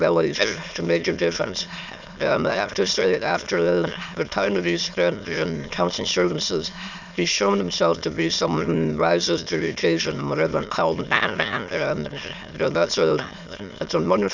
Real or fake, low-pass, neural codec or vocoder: fake; 7.2 kHz; autoencoder, 22.05 kHz, a latent of 192 numbers a frame, VITS, trained on many speakers